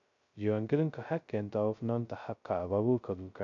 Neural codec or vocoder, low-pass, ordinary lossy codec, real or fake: codec, 16 kHz, 0.2 kbps, FocalCodec; 7.2 kHz; none; fake